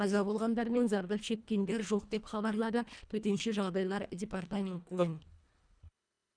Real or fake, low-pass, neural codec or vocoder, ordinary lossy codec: fake; 9.9 kHz; codec, 24 kHz, 1.5 kbps, HILCodec; none